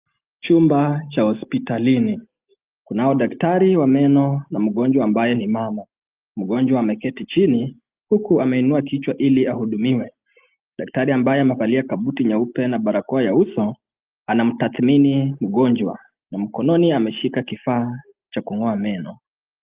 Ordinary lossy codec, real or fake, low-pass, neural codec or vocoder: Opus, 24 kbps; real; 3.6 kHz; none